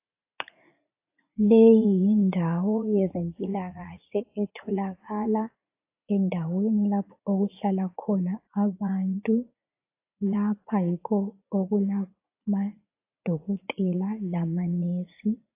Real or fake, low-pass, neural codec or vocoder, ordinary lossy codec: fake; 3.6 kHz; vocoder, 44.1 kHz, 80 mel bands, Vocos; AAC, 24 kbps